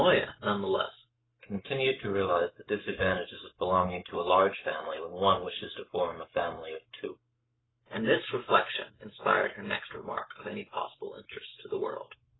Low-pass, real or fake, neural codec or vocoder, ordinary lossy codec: 7.2 kHz; fake; codec, 44.1 kHz, 7.8 kbps, Pupu-Codec; AAC, 16 kbps